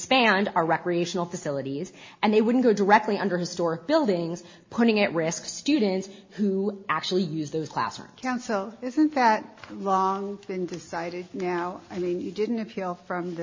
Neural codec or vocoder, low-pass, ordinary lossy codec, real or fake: none; 7.2 kHz; MP3, 32 kbps; real